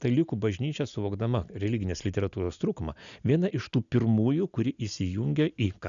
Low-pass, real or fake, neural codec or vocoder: 7.2 kHz; real; none